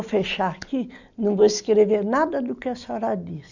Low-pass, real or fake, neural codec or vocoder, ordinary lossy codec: 7.2 kHz; real; none; none